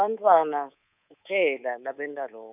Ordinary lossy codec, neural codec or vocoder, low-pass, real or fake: none; codec, 24 kHz, 3.1 kbps, DualCodec; 3.6 kHz; fake